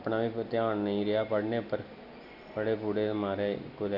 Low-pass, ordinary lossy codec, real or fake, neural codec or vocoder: 5.4 kHz; none; real; none